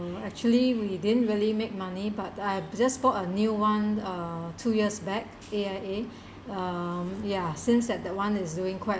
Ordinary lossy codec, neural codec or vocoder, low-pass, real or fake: none; none; none; real